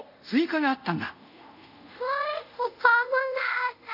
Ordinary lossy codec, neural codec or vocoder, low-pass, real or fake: none; codec, 24 kHz, 0.5 kbps, DualCodec; 5.4 kHz; fake